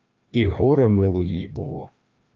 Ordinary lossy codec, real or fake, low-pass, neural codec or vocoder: Opus, 24 kbps; fake; 7.2 kHz; codec, 16 kHz, 1 kbps, FreqCodec, larger model